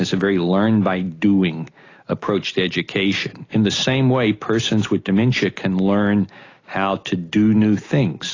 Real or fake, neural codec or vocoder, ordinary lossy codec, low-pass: real; none; AAC, 32 kbps; 7.2 kHz